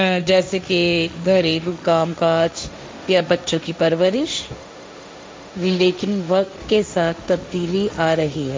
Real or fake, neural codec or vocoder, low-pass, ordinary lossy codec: fake; codec, 16 kHz, 1.1 kbps, Voila-Tokenizer; none; none